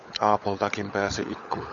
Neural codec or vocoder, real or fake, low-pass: codec, 16 kHz, 16 kbps, FunCodec, trained on LibriTTS, 50 frames a second; fake; 7.2 kHz